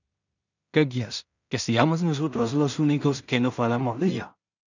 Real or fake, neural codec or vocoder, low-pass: fake; codec, 16 kHz in and 24 kHz out, 0.4 kbps, LongCat-Audio-Codec, two codebook decoder; 7.2 kHz